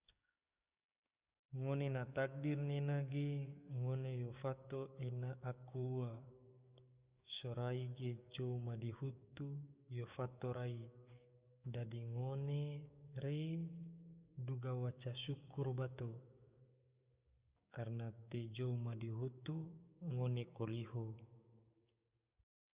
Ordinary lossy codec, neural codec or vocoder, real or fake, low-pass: Opus, 64 kbps; codec, 16 kHz, 6 kbps, DAC; fake; 3.6 kHz